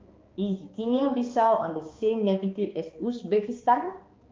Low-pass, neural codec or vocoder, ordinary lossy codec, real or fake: 7.2 kHz; codec, 16 kHz, 2 kbps, X-Codec, HuBERT features, trained on balanced general audio; Opus, 24 kbps; fake